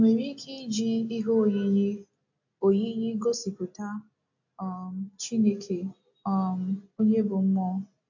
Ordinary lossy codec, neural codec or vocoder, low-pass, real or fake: AAC, 48 kbps; none; 7.2 kHz; real